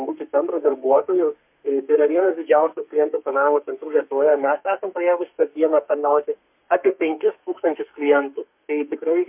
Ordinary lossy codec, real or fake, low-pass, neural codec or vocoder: MP3, 32 kbps; fake; 3.6 kHz; codec, 32 kHz, 1.9 kbps, SNAC